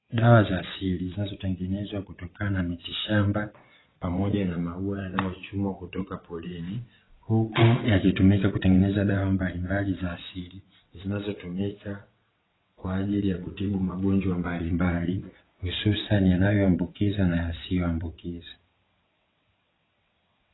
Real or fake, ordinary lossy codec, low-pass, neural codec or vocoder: fake; AAC, 16 kbps; 7.2 kHz; vocoder, 22.05 kHz, 80 mel bands, WaveNeXt